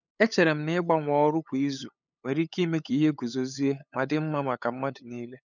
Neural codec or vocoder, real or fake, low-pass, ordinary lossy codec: codec, 16 kHz, 8 kbps, FunCodec, trained on LibriTTS, 25 frames a second; fake; 7.2 kHz; none